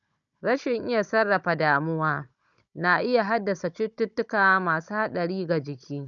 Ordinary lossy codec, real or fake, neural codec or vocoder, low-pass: none; fake; codec, 16 kHz, 16 kbps, FunCodec, trained on Chinese and English, 50 frames a second; 7.2 kHz